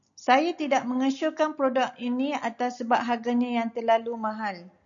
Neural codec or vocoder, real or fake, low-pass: none; real; 7.2 kHz